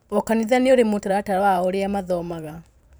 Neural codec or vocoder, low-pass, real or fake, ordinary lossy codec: vocoder, 44.1 kHz, 128 mel bands every 512 samples, BigVGAN v2; none; fake; none